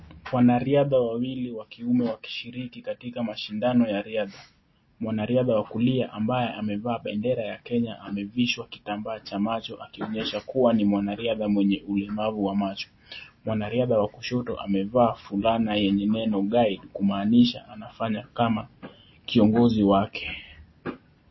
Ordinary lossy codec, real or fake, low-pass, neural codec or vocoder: MP3, 24 kbps; real; 7.2 kHz; none